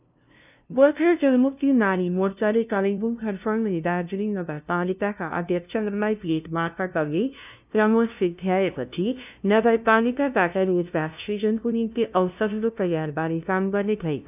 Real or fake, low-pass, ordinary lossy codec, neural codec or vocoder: fake; 3.6 kHz; none; codec, 16 kHz, 0.5 kbps, FunCodec, trained on LibriTTS, 25 frames a second